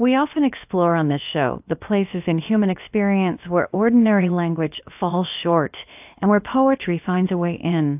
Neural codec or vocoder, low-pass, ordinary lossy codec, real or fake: codec, 16 kHz, about 1 kbps, DyCAST, with the encoder's durations; 3.6 kHz; AAC, 32 kbps; fake